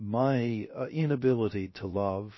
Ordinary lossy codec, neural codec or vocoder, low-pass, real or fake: MP3, 24 kbps; codec, 16 kHz, 0.3 kbps, FocalCodec; 7.2 kHz; fake